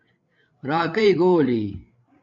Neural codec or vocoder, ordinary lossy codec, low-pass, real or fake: codec, 16 kHz, 8 kbps, FreqCodec, larger model; MP3, 64 kbps; 7.2 kHz; fake